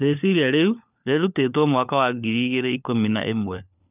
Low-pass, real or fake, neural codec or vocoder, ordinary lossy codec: 3.6 kHz; fake; codec, 16 kHz, 4 kbps, FunCodec, trained on LibriTTS, 50 frames a second; none